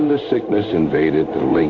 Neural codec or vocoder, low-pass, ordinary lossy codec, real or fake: codec, 16 kHz in and 24 kHz out, 1 kbps, XY-Tokenizer; 7.2 kHz; Opus, 64 kbps; fake